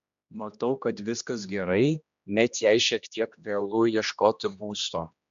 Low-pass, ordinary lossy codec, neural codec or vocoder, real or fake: 7.2 kHz; MP3, 64 kbps; codec, 16 kHz, 1 kbps, X-Codec, HuBERT features, trained on general audio; fake